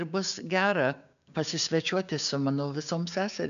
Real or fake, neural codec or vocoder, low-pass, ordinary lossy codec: fake; codec, 16 kHz, 6 kbps, DAC; 7.2 kHz; AAC, 96 kbps